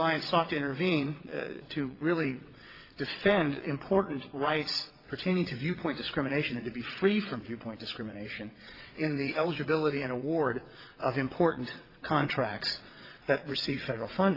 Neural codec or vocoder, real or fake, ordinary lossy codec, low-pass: vocoder, 22.05 kHz, 80 mel bands, WaveNeXt; fake; AAC, 32 kbps; 5.4 kHz